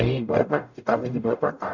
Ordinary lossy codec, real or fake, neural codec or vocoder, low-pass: none; fake; codec, 44.1 kHz, 0.9 kbps, DAC; 7.2 kHz